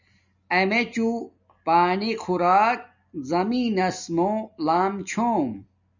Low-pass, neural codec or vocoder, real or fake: 7.2 kHz; none; real